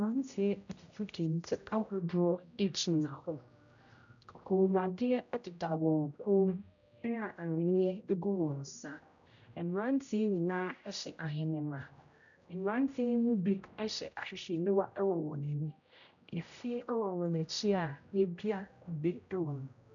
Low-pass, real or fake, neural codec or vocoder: 7.2 kHz; fake; codec, 16 kHz, 0.5 kbps, X-Codec, HuBERT features, trained on general audio